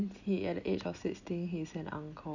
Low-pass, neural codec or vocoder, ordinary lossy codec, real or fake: 7.2 kHz; none; none; real